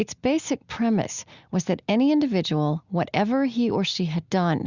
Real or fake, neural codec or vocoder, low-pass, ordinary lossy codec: real; none; 7.2 kHz; Opus, 64 kbps